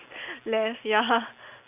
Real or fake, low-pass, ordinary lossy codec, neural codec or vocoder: real; 3.6 kHz; none; none